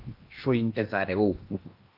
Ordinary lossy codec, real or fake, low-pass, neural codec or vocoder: Opus, 24 kbps; fake; 5.4 kHz; codec, 16 kHz in and 24 kHz out, 0.8 kbps, FocalCodec, streaming, 65536 codes